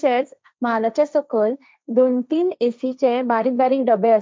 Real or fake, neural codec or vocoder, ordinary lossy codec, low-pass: fake; codec, 16 kHz, 1.1 kbps, Voila-Tokenizer; none; none